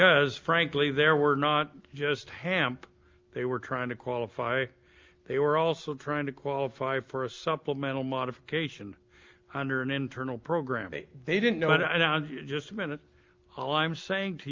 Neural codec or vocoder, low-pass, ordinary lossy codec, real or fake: none; 7.2 kHz; Opus, 24 kbps; real